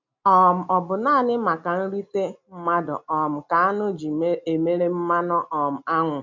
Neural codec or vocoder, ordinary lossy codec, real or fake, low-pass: none; MP3, 64 kbps; real; 7.2 kHz